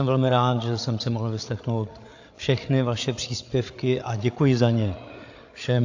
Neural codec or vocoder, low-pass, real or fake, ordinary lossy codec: codec, 16 kHz, 8 kbps, FreqCodec, larger model; 7.2 kHz; fake; AAC, 48 kbps